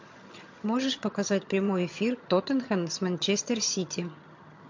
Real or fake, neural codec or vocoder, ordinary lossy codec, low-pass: fake; vocoder, 22.05 kHz, 80 mel bands, HiFi-GAN; MP3, 48 kbps; 7.2 kHz